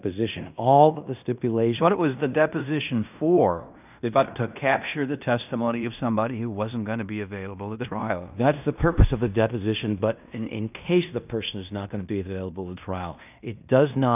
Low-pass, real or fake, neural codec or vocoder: 3.6 kHz; fake; codec, 16 kHz in and 24 kHz out, 0.9 kbps, LongCat-Audio-Codec, fine tuned four codebook decoder